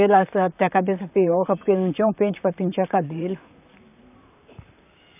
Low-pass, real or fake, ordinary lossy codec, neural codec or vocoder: 3.6 kHz; fake; none; vocoder, 44.1 kHz, 128 mel bands, Pupu-Vocoder